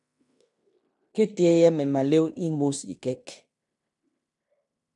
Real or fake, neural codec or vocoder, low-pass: fake; codec, 16 kHz in and 24 kHz out, 0.9 kbps, LongCat-Audio-Codec, fine tuned four codebook decoder; 10.8 kHz